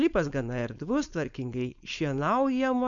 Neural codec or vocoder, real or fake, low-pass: codec, 16 kHz, 4.8 kbps, FACodec; fake; 7.2 kHz